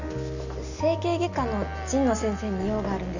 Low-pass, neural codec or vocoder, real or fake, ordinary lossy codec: 7.2 kHz; none; real; none